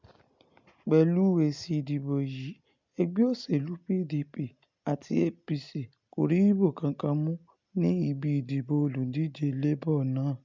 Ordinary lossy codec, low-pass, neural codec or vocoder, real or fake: MP3, 64 kbps; 7.2 kHz; none; real